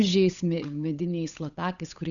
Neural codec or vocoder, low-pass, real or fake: codec, 16 kHz, 8 kbps, FunCodec, trained on Chinese and English, 25 frames a second; 7.2 kHz; fake